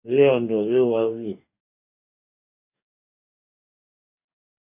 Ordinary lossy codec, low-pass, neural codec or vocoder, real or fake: AAC, 32 kbps; 3.6 kHz; codec, 44.1 kHz, 2.6 kbps, DAC; fake